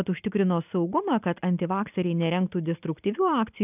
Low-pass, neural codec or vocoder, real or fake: 3.6 kHz; none; real